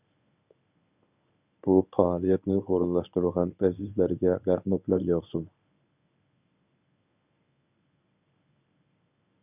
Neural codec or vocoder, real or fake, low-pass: codec, 24 kHz, 0.9 kbps, WavTokenizer, medium speech release version 1; fake; 3.6 kHz